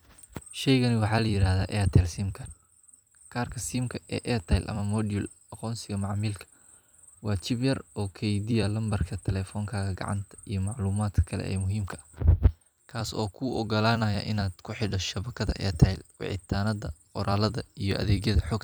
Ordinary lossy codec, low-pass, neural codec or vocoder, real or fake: none; none; vocoder, 44.1 kHz, 128 mel bands every 256 samples, BigVGAN v2; fake